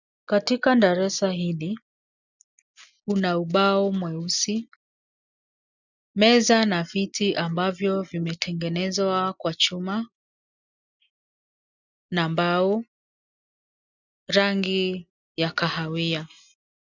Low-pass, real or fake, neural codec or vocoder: 7.2 kHz; real; none